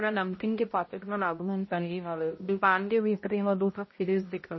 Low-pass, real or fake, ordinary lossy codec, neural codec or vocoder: 7.2 kHz; fake; MP3, 24 kbps; codec, 16 kHz, 0.5 kbps, X-Codec, HuBERT features, trained on balanced general audio